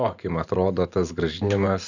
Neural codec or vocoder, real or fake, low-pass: vocoder, 44.1 kHz, 128 mel bands every 512 samples, BigVGAN v2; fake; 7.2 kHz